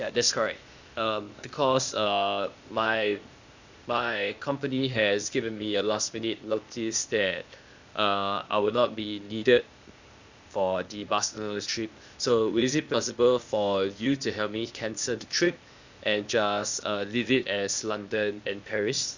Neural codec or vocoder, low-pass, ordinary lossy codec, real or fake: codec, 16 kHz, 0.8 kbps, ZipCodec; 7.2 kHz; Opus, 64 kbps; fake